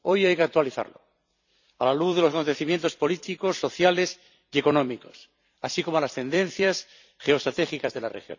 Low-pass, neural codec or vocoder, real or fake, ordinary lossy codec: 7.2 kHz; vocoder, 44.1 kHz, 128 mel bands every 256 samples, BigVGAN v2; fake; none